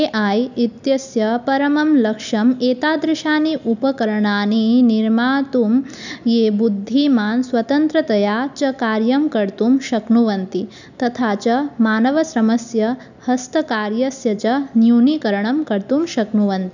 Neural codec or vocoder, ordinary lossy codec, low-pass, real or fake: none; none; 7.2 kHz; real